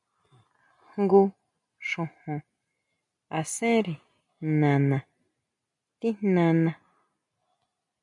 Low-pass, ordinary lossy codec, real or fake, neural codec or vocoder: 10.8 kHz; MP3, 64 kbps; real; none